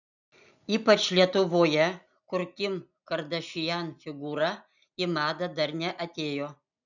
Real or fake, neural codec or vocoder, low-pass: real; none; 7.2 kHz